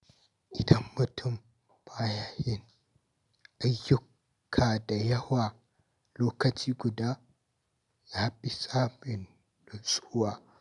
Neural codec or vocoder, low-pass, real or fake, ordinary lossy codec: none; 10.8 kHz; real; none